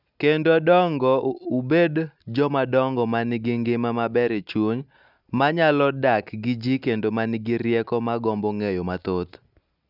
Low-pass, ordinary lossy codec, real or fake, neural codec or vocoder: 5.4 kHz; none; real; none